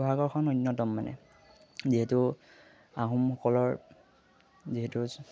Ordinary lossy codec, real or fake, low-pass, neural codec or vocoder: none; real; none; none